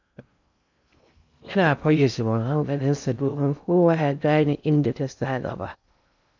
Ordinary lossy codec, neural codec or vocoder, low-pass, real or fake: none; codec, 16 kHz in and 24 kHz out, 0.6 kbps, FocalCodec, streaming, 2048 codes; 7.2 kHz; fake